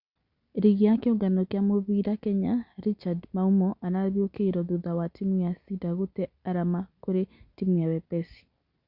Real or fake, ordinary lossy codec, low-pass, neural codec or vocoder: real; Opus, 64 kbps; 5.4 kHz; none